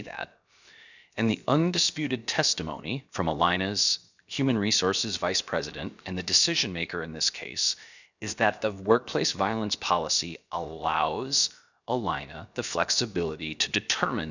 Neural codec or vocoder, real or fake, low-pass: codec, 16 kHz, about 1 kbps, DyCAST, with the encoder's durations; fake; 7.2 kHz